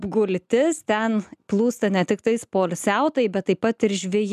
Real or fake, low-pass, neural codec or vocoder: real; 14.4 kHz; none